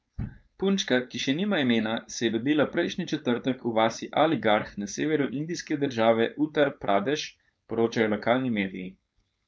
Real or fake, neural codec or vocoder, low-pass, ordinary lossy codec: fake; codec, 16 kHz, 4.8 kbps, FACodec; none; none